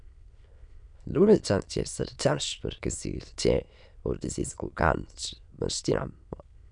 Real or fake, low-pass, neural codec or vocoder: fake; 9.9 kHz; autoencoder, 22.05 kHz, a latent of 192 numbers a frame, VITS, trained on many speakers